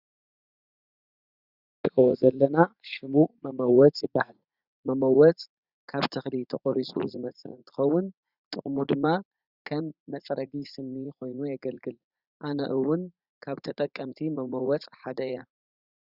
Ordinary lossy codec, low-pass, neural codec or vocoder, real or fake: Opus, 64 kbps; 5.4 kHz; vocoder, 44.1 kHz, 80 mel bands, Vocos; fake